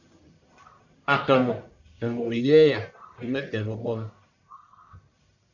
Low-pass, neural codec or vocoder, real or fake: 7.2 kHz; codec, 44.1 kHz, 1.7 kbps, Pupu-Codec; fake